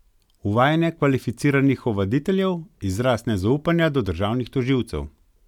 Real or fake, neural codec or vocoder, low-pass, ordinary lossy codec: real; none; 19.8 kHz; none